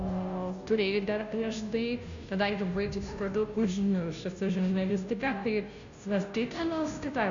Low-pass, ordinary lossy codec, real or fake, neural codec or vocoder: 7.2 kHz; AAC, 64 kbps; fake; codec, 16 kHz, 0.5 kbps, FunCodec, trained on Chinese and English, 25 frames a second